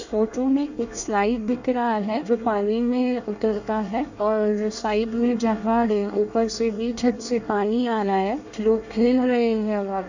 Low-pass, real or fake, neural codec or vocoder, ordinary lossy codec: 7.2 kHz; fake; codec, 24 kHz, 1 kbps, SNAC; none